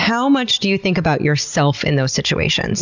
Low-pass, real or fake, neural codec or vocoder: 7.2 kHz; real; none